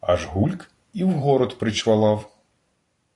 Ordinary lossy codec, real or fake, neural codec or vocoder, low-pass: AAC, 48 kbps; fake; vocoder, 44.1 kHz, 128 mel bands every 256 samples, BigVGAN v2; 10.8 kHz